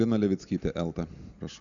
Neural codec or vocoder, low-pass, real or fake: none; 7.2 kHz; real